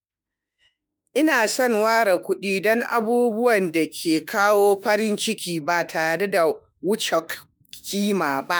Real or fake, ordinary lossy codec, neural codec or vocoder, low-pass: fake; none; autoencoder, 48 kHz, 32 numbers a frame, DAC-VAE, trained on Japanese speech; none